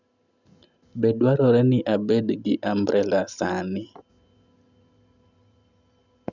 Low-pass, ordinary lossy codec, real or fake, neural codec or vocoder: 7.2 kHz; none; real; none